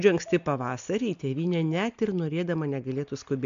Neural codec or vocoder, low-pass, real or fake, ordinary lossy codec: none; 7.2 kHz; real; MP3, 64 kbps